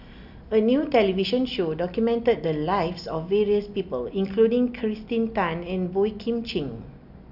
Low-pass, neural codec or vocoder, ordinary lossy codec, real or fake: 5.4 kHz; none; none; real